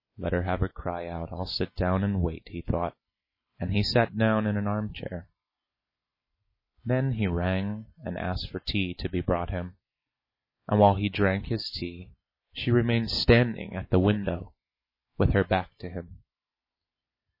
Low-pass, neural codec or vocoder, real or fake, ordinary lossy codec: 5.4 kHz; none; real; MP3, 24 kbps